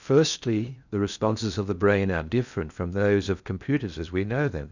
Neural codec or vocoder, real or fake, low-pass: codec, 16 kHz in and 24 kHz out, 0.6 kbps, FocalCodec, streaming, 2048 codes; fake; 7.2 kHz